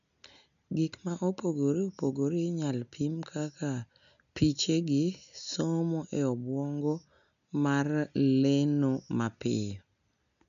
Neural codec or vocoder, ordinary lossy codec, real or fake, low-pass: none; none; real; 7.2 kHz